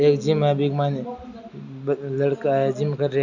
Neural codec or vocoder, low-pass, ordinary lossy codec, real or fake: none; 7.2 kHz; Opus, 64 kbps; real